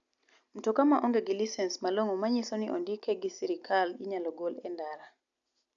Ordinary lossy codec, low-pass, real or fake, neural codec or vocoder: MP3, 96 kbps; 7.2 kHz; real; none